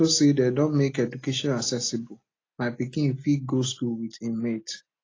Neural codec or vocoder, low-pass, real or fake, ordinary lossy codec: none; 7.2 kHz; real; AAC, 32 kbps